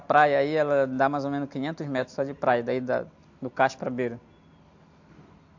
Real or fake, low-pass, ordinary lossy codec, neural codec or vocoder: real; 7.2 kHz; AAC, 48 kbps; none